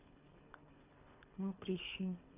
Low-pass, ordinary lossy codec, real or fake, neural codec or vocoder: 3.6 kHz; none; fake; codec, 24 kHz, 3 kbps, HILCodec